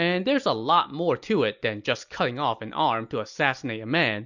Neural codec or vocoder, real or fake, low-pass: none; real; 7.2 kHz